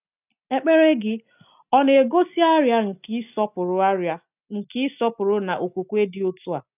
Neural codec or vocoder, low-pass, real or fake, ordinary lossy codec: none; 3.6 kHz; real; none